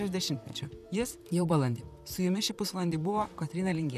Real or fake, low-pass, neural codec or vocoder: fake; 14.4 kHz; vocoder, 44.1 kHz, 128 mel bands, Pupu-Vocoder